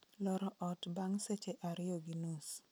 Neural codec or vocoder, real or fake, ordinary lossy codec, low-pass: none; real; none; none